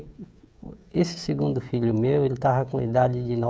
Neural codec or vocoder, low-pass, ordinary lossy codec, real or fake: codec, 16 kHz, 16 kbps, FreqCodec, smaller model; none; none; fake